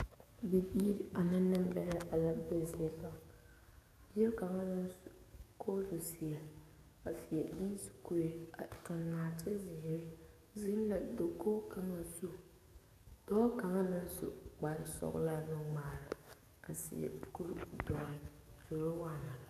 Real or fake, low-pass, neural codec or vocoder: fake; 14.4 kHz; codec, 44.1 kHz, 7.8 kbps, DAC